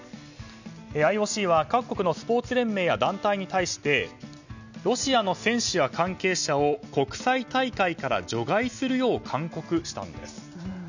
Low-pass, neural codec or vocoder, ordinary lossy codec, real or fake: 7.2 kHz; none; none; real